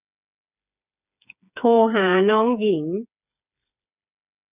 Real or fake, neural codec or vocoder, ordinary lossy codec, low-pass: fake; codec, 16 kHz, 4 kbps, FreqCodec, smaller model; none; 3.6 kHz